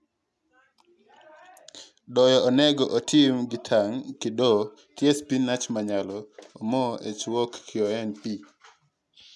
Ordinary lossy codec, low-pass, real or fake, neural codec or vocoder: none; none; real; none